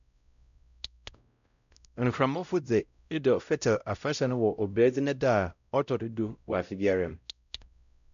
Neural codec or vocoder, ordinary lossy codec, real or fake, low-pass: codec, 16 kHz, 0.5 kbps, X-Codec, WavLM features, trained on Multilingual LibriSpeech; none; fake; 7.2 kHz